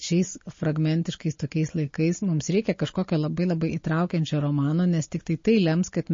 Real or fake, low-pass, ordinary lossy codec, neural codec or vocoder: real; 7.2 kHz; MP3, 32 kbps; none